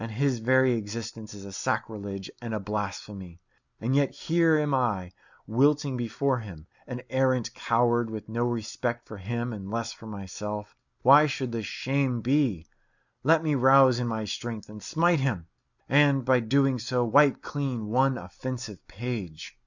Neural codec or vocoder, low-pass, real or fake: none; 7.2 kHz; real